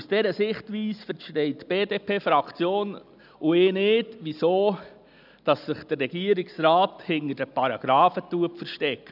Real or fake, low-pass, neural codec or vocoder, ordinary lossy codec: real; 5.4 kHz; none; none